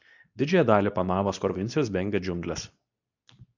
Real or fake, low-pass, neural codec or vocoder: fake; 7.2 kHz; codec, 24 kHz, 0.9 kbps, WavTokenizer, medium speech release version 2